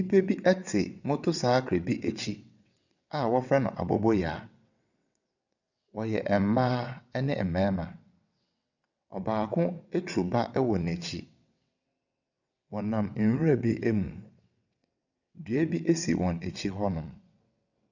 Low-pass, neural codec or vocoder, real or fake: 7.2 kHz; vocoder, 22.05 kHz, 80 mel bands, WaveNeXt; fake